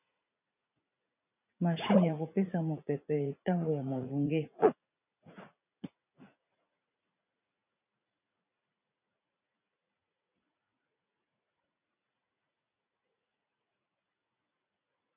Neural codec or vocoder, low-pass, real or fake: vocoder, 44.1 kHz, 80 mel bands, Vocos; 3.6 kHz; fake